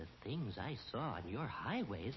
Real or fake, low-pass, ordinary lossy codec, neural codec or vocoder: real; 7.2 kHz; MP3, 24 kbps; none